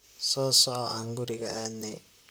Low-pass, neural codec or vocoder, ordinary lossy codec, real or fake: none; vocoder, 44.1 kHz, 128 mel bands, Pupu-Vocoder; none; fake